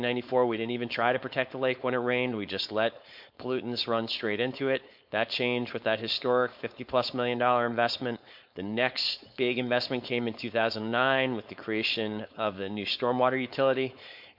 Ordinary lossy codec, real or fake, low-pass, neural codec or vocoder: MP3, 48 kbps; fake; 5.4 kHz; codec, 16 kHz, 4.8 kbps, FACodec